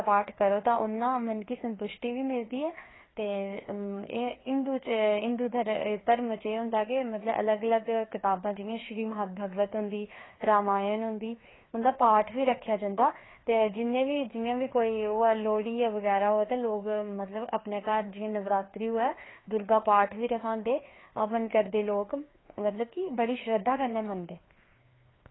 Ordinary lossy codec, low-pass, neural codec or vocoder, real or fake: AAC, 16 kbps; 7.2 kHz; codec, 16 kHz, 2 kbps, FreqCodec, larger model; fake